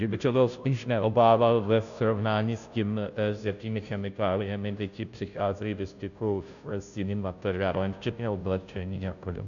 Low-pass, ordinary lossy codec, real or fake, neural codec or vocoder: 7.2 kHz; MP3, 96 kbps; fake; codec, 16 kHz, 0.5 kbps, FunCodec, trained on Chinese and English, 25 frames a second